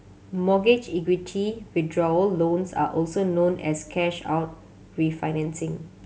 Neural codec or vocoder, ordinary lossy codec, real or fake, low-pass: none; none; real; none